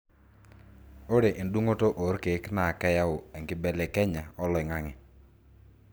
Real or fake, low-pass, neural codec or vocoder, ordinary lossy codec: real; none; none; none